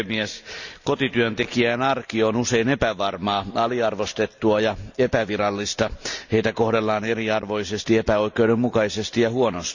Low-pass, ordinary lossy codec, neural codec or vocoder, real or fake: 7.2 kHz; none; none; real